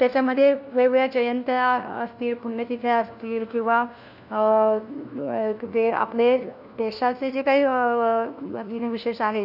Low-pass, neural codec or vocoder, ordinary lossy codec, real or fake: 5.4 kHz; codec, 16 kHz, 1 kbps, FunCodec, trained on LibriTTS, 50 frames a second; none; fake